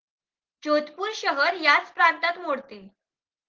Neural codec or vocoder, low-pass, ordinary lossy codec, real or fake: none; 7.2 kHz; Opus, 16 kbps; real